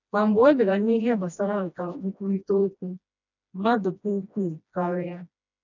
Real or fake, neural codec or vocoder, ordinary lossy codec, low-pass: fake; codec, 16 kHz, 1 kbps, FreqCodec, smaller model; none; 7.2 kHz